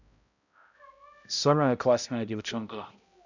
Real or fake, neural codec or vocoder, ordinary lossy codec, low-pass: fake; codec, 16 kHz, 0.5 kbps, X-Codec, HuBERT features, trained on balanced general audio; none; 7.2 kHz